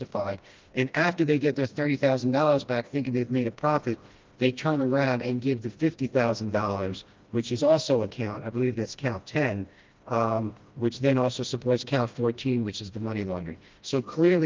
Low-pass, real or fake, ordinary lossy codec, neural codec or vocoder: 7.2 kHz; fake; Opus, 32 kbps; codec, 16 kHz, 1 kbps, FreqCodec, smaller model